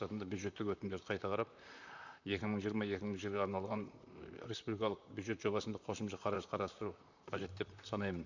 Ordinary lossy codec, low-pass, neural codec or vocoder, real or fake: none; 7.2 kHz; vocoder, 44.1 kHz, 128 mel bands, Pupu-Vocoder; fake